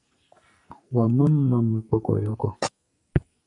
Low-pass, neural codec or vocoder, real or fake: 10.8 kHz; codec, 44.1 kHz, 2.6 kbps, SNAC; fake